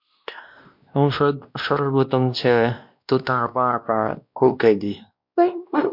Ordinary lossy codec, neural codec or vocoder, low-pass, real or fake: MP3, 48 kbps; codec, 16 kHz, 1 kbps, X-Codec, WavLM features, trained on Multilingual LibriSpeech; 5.4 kHz; fake